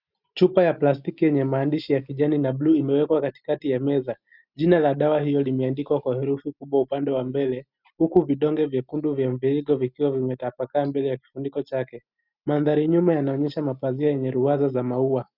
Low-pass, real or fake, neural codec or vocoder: 5.4 kHz; real; none